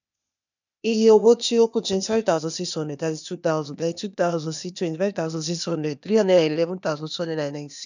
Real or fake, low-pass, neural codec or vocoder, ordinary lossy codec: fake; 7.2 kHz; codec, 16 kHz, 0.8 kbps, ZipCodec; none